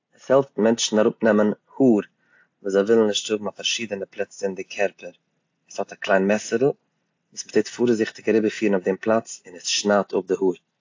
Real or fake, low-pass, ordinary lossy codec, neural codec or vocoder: real; 7.2 kHz; AAC, 48 kbps; none